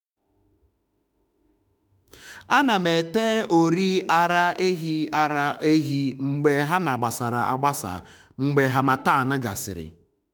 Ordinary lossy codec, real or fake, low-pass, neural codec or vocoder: none; fake; none; autoencoder, 48 kHz, 32 numbers a frame, DAC-VAE, trained on Japanese speech